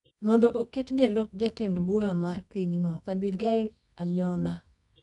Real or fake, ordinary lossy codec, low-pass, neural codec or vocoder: fake; none; 10.8 kHz; codec, 24 kHz, 0.9 kbps, WavTokenizer, medium music audio release